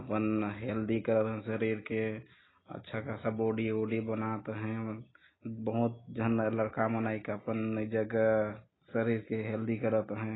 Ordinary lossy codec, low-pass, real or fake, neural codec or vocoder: AAC, 16 kbps; 7.2 kHz; real; none